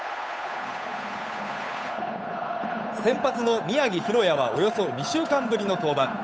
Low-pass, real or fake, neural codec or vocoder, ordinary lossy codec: none; fake; codec, 16 kHz, 8 kbps, FunCodec, trained on Chinese and English, 25 frames a second; none